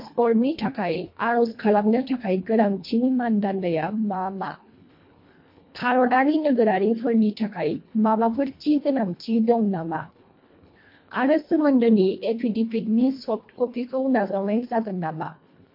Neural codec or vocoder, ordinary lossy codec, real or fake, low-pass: codec, 24 kHz, 1.5 kbps, HILCodec; MP3, 32 kbps; fake; 5.4 kHz